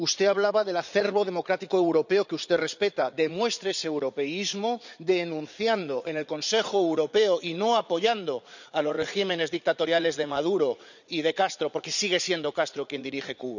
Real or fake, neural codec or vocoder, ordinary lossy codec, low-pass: fake; vocoder, 44.1 kHz, 80 mel bands, Vocos; none; 7.2 kHz